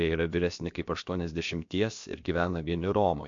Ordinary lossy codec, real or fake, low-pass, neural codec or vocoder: MP3, 48 kbps; fake; 7.2 kHz; codec, 16 kHz, about 1 kbps, DyCAST, with the encoder's durations